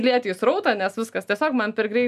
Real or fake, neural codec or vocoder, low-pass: real; none; 14.4 kHz